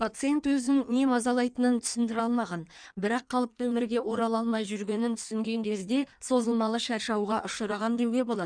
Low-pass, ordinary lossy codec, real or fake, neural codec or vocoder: 9.9 kHz; none; fake; codec, 16 kHz in and 24 kHz out, 1.1 kbps, FireRedTTS-2 codec